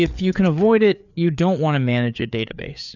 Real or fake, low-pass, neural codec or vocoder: fake; 7.2 kHz; codec, 16 kHz, 4 kbps, FreqCodec, larger model